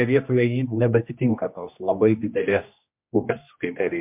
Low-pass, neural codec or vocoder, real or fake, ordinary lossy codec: 3.6 kHz; codec, 16 kHz, 1 kbps, X-Codec, HuBERT features, trained on general audio; fake; MP3, 32 kbps